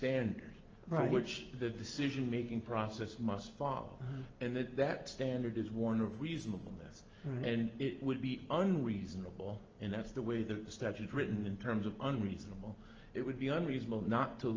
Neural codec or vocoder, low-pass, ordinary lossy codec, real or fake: none; 7.2 kHz; Opus, 24 kbps; real